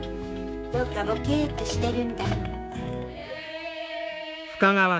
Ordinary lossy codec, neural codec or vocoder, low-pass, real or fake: none; codec, 16 kHz, 6 kbps, DAC; none; fake